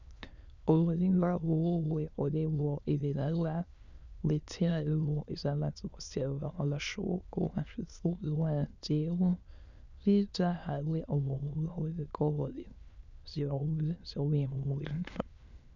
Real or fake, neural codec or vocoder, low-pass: fake; autoencoder, 22.05 kHz, a latent of 192 numbers a frame, VITS, trained on many speakers; 7.2 kHz